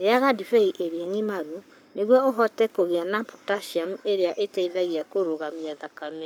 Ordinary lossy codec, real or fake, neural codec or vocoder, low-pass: none; fake; codec, 44.1 kHz, 7.8 kbps, Pupu-Codec; none